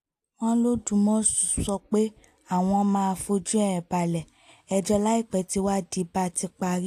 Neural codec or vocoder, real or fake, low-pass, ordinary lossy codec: none; real; 14.4 kHz; AAC, 64 kbps